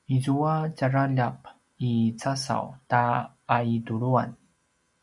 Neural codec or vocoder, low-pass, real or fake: none; 10.8 kHz; real